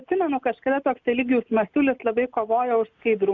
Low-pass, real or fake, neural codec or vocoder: 7.2 kHz; real; none